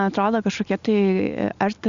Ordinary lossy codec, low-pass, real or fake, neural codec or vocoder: Opus, 64 kbps; 7.2 kHz; real; none